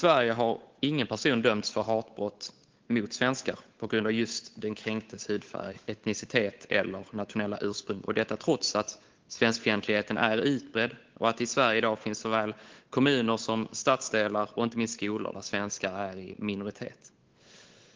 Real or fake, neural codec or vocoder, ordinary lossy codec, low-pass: fake; codec, 16 kHz, 8 kbps, FunCodec, trained on Chinese and English, 25 frames a second; Opus, 16 kbps; 7.2 kHz